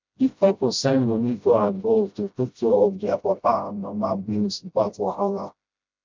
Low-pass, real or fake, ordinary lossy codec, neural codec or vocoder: 7.2 kHz; fake; MP3, 64 kbps; codec, 16 kHz, 0.5 kbps, FreqCodec, smaller model